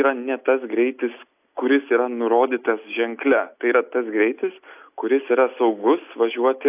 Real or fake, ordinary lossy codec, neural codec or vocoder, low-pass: real; AAC, 32 kbps; none; 3.6 kHz